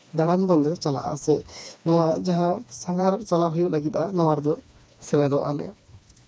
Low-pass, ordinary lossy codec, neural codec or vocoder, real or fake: none; none; codec, 16 kHz, 2 kbps, FreqCodec, smaller model; fake